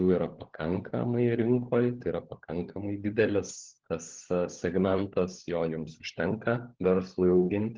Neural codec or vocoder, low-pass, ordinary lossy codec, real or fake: codec, 16 kHz, 16 kbps, FunCodec, trained on LibriTTS, 50 frames a second; 7.2 kHz; Opus, 16 kbps; fake